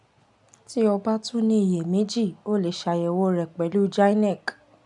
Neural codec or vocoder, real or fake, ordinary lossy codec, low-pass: none; real; none; 10.8 kHz